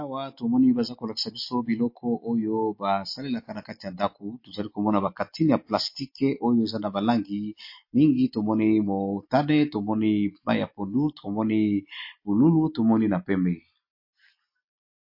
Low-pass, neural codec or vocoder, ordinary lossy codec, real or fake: 5.4 kHz; none; MP3, 32 kbps; real